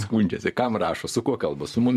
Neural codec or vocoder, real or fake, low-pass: vocoder, 44.1 kHz, 128 mel bands every 512 samples, BigVGAN v2; fake; 14.4 kHz